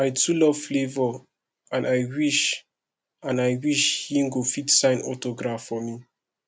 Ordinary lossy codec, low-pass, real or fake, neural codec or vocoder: none; none; real; none